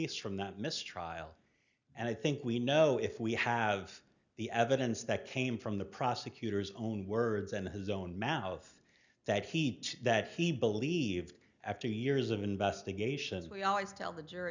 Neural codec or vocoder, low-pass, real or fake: none; 7.2 kHz; real